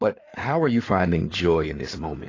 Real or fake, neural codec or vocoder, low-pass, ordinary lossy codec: fake; codec, 16 kHz in and 24 kHz out, 2.2 kbps, FireRedTTS-2 codec; 7.2 kHz; AAC, 32 kbps